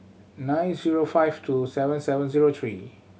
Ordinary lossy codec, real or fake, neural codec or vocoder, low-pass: none; real; none; none